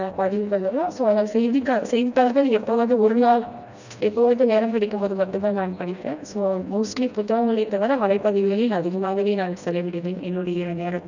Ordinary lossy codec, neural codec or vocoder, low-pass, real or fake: none; codec, 16 kHz, 1 kbps, FreqCodec, smaller model; 7.2 kHz; fake